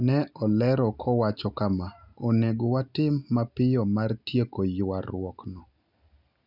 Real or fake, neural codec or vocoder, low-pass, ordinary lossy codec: real; none; 5.4 kHz; none